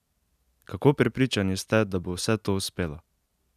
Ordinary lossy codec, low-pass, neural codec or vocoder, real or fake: none; 14.4 kHz; none; real